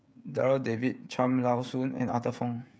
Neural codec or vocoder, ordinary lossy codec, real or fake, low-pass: codec, 16 kHz, 16 kbps, FreqCodec, smaller model; none; fake; none